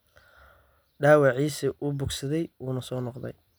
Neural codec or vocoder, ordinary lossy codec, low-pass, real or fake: none; none; none; real